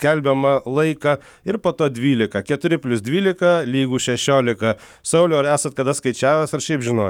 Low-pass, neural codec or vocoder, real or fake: 19.8 kHz; codec, 44.1 kHz, 7.8 kbps, DAC; fake